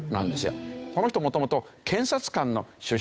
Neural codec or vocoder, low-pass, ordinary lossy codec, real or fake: codec, 16 kHz, 8 kbps, FunCodec, trained on Chinese and English, 25 frames a second; none; none; fake